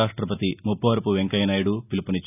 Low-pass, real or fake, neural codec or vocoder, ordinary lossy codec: 3.6 kHz; real; none; none